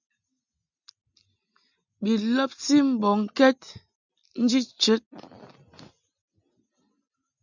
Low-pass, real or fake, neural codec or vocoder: 7.2 kHz; real; none